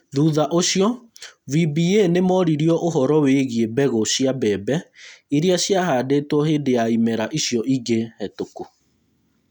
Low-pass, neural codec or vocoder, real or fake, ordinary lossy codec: 19.8 kHz; none; real; none